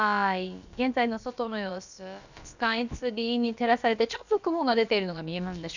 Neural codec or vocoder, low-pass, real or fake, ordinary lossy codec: codec, 16 kHz, about 1 kbps, DyCAST, with the encoder's durations; 7.2 kHz; fake; none